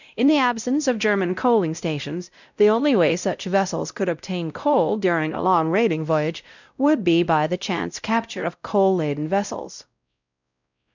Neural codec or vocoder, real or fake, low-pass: codec, 16 kHz, 0.5 kbps, X-Codec, WavLM features, trained on Multilingual LibriSpeech; fake; 7.2 kHz